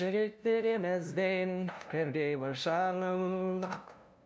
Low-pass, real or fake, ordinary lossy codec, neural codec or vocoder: none; fake; none; codec, 16 kHz, 0.5 kbps, FunCodec, trained on LibriTTS, 25 frames a second